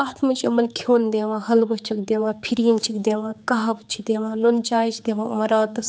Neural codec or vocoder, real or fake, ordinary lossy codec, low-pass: codec, 16 kHz, 4 kbps, X-Codec, HuBERT features, trained on general audio; fake; none; none